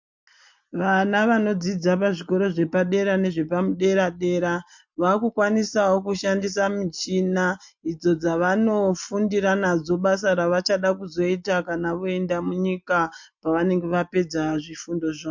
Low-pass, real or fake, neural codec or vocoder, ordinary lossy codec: 7.2 kHz; real; none; MP3, 48 kbps